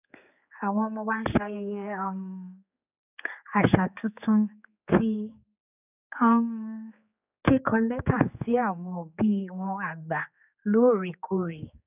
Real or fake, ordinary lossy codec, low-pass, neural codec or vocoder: fake; none; 3.6 kHz; codec, 16 kHz, 4 kbps, X-Codec, HuBERT features, trained on general audio